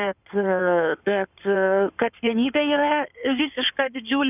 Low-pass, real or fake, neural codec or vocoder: 3.6 kHz; fake; codec, 16 kHz in and 24 kHz out, 2.2 kbps, FireRedTTS-2 codec